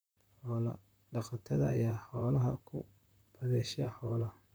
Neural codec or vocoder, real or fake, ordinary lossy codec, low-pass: vocoder, 44.1 kHz, 128 mel bands, Pupu-Vocoder; fake; none; none